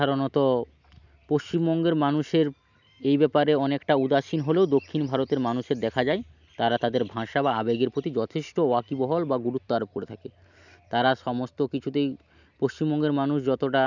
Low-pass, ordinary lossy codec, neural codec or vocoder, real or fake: 7.2 kHz; none; none; real